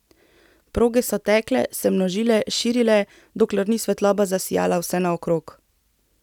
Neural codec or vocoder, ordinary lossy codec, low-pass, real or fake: none; none; 19.8 kHz; real